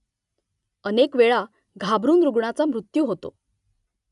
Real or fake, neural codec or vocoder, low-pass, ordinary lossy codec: real; none; 10.8 kHz; none